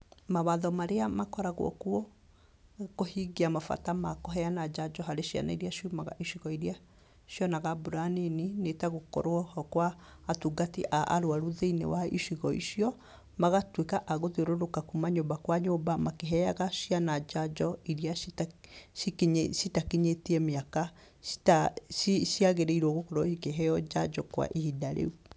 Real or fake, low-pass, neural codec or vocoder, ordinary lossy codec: real; none; none; none